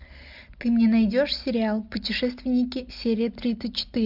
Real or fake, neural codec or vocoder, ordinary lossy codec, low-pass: real; none; MP3, 48 kbps; 5.4 kHz